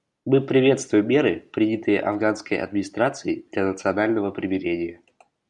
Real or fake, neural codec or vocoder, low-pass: real; none; 10.8 kHz